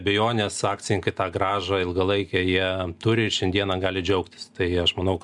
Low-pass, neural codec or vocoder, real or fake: 10.8 kHz; none; real